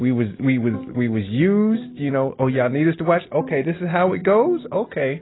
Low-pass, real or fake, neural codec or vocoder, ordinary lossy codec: 7.2 kHz; real; none; AAC, 16 kbps